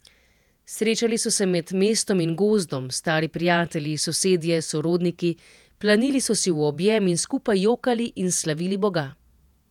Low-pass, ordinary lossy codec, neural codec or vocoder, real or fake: 19.8 kHz; none; vocoder, 48 kHz, 128 mel bands, Vocos; fake